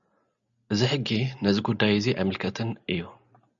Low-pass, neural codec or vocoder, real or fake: 7.2 kHz; none; real